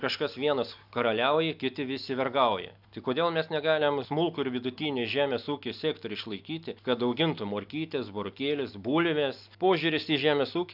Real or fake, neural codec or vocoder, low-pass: real; none; 5.4 kHz